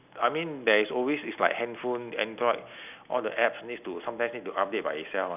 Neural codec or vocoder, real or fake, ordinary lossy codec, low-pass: none; real; none; 3.6 kHz